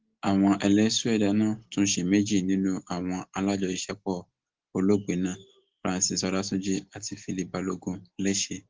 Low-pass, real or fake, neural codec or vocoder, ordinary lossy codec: 7.2 kHz; real; none; Opus, 16 kbps